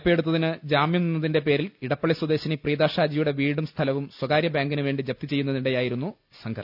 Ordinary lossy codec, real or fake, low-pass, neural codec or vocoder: none; real; 5.4 kHz; none